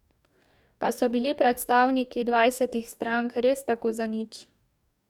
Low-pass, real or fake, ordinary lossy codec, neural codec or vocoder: 19.8 kHz; fake; none; codec, 44.1 kHz, 2.6 kbps, DAC